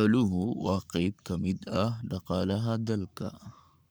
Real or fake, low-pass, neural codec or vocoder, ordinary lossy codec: fake; none; codec, 44.1 kHz, 7.8 kbps, Pupu-Codec; none